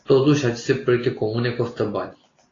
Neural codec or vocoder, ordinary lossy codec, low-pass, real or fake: none; AAC, 32 kbps; 7.2 kHz; real